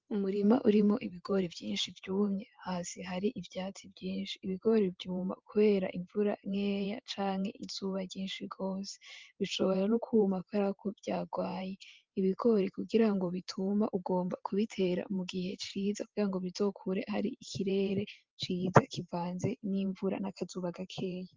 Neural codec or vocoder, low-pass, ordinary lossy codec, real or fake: vocoder, 44.1 kHz, 128 mel bands every 512 samples, BigVGAN v2; 7.2 kHz; Opus, 24 kbps; fake